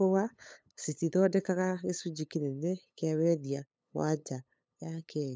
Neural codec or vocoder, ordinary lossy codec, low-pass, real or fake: codec, 16 kHz, 8 kbps, FunCodec, trained on LibriTTS, 25 frames a second; none; none; fake